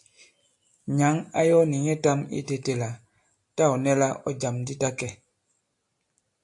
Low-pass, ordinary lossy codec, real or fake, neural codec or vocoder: 10.8 kHz; AAC, 64 kbps; real; none